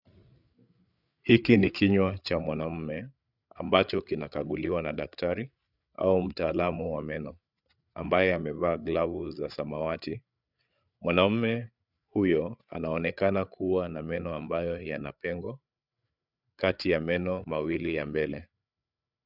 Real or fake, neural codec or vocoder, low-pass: fake; codec, 16 kHz, 8 kbps, FreqCodec, larger model; 5.4 kHz